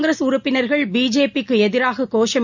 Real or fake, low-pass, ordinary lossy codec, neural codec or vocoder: real; 7.2 kHz; none; none